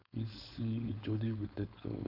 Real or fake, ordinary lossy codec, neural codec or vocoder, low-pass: fake; none; codec, 16 kHz, 4.8 kbps, FACodec; 5.4 kHz